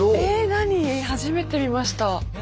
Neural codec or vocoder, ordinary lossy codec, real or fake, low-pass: none; none; real; none